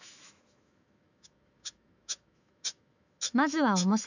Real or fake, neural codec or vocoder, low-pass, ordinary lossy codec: fake; autoencoder, 48 kHz, 128 numbers a frame, DAC-VAE, trained on Japanese speech; 7.2 kHz; none